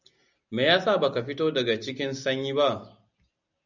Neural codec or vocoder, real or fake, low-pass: none; real; 7.2 kHz